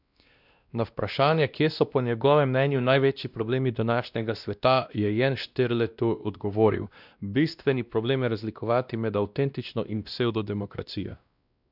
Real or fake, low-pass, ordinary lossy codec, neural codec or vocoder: fake; 5.4 kHz; none; codec, 16 kHz, 1 kbps, X-Codec, WavLM features, trained on Multilingual LibriSpeech